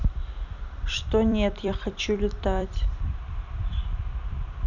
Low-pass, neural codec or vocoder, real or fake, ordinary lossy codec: 7.2 kHz; vocoder, 44.1 kHz, 128 mel bands every 256 samples, BigVGAN v2; fake; none